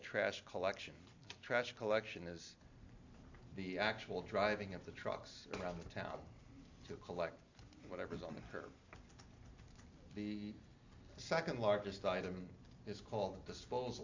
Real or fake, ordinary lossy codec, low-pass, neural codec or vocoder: real; AAC, 48 kbps; 7.2 kHz; none